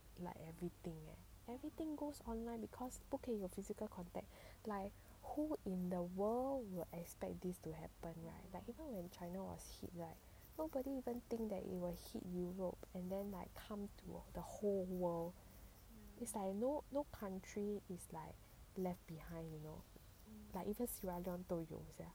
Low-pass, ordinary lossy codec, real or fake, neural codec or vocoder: none; none; real; none